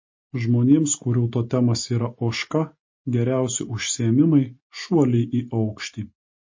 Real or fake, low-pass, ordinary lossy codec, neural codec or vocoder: real; 7.2 kHz; MP3, 32 kbps; none